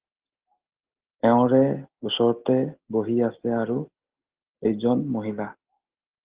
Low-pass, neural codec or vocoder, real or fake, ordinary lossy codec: 3.6 kHz; none; real; Opus, 16 kbps